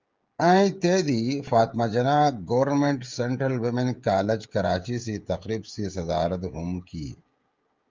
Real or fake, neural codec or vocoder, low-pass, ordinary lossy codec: fake; codec, 16 kHz, 16 kbps, FreqCodec, smaller model; 7.2 kHz; Opus, 24 kbps